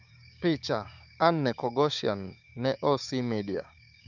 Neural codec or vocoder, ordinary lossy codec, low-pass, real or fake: codec, 16 kHz, 6 kbps, DAC; none; 7.2 kHz; fake